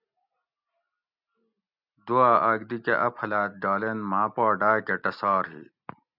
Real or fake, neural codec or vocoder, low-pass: real; none; 5.4 kHz